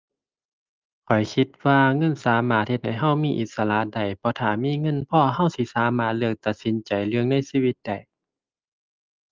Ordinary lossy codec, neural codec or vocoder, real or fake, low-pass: Opus, 32 kbps; none; real; 7.2 kHz